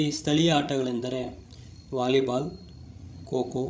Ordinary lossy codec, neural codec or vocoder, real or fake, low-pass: none; codec, 16 kHz, 16 kbps, FreqCodec, larger model; fake; none